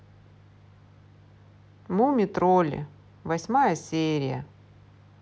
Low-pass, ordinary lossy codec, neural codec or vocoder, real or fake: none; none; none; real